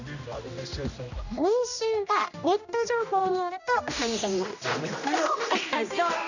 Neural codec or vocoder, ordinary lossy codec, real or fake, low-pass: codec, 16 kHz, 1 kbps, X-Codec, HuBERT features, trained on general audio; none; fake; 7.2 kHz